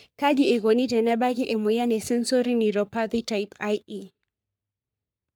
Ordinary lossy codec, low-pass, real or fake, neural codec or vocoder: none; none; fake; codec, 44.1 kHz, 3.4 kbps, Pupu-Codec